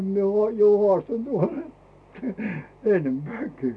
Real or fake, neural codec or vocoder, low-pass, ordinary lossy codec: real; none; none; none